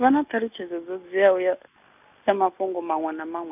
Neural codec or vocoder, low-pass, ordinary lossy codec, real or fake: none; 3.6 kHz; none; real